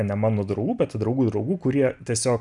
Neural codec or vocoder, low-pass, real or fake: none; 10.8 kHz; real